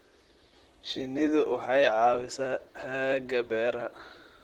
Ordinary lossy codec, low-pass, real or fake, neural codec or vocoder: Opus, 16 kbps; 19.8 kHz; fake; vocoder, 44.1 kHz, 128 mel bands, Pupu-Vocoder